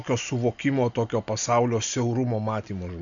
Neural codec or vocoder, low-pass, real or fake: none; 7.2 kHz; real